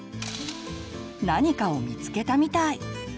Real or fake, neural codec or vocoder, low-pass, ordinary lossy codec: real; none; none; none